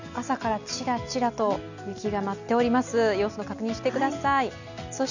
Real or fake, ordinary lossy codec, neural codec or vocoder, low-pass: real; none; none; 7.2 kHz